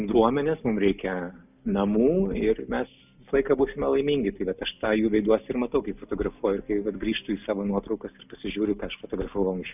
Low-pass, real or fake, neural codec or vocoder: 3.6 kHz; real; none